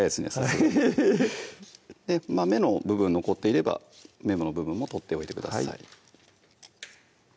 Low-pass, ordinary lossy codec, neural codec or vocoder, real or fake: none; none; none; real